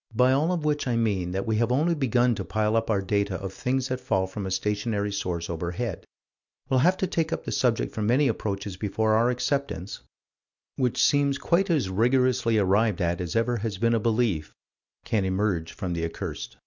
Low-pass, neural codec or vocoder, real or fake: 7.2 kHz; none; real